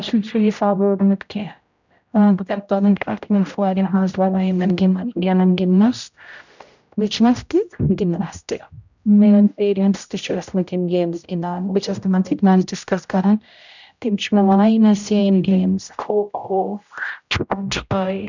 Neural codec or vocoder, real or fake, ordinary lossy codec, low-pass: codec, 16 kHz, 0.5 kbps, X-Codec, HuBERT features, trained on general audio; fake; none; 7.2 kHz